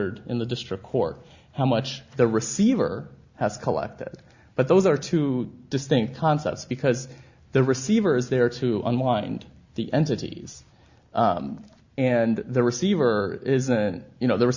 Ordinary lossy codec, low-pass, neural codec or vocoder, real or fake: Opus, 64 kbps; 7.2 kHz; none; real